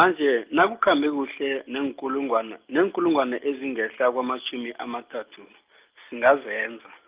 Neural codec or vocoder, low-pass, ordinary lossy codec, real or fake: none; 3.6 kHz; Opus, 24 kbps; real